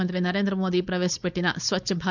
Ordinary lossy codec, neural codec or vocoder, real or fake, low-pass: none; codec, 16 kHz, 4.8 kbps, FACodec; fake; 7.2 kHz